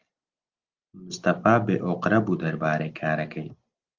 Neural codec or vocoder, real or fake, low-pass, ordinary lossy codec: none; real; 7.2 kHz; Opus, 32 kbps